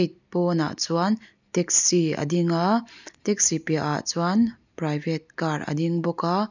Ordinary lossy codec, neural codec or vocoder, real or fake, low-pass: none; none; real; 7.2 kHz